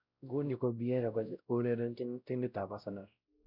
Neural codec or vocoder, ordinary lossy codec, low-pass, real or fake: codec, 16 kHz, 0.5 kbps, X-Codec, WavLM features, trained on Multilingual LibriSpeech; none; 5.4 kHz; fake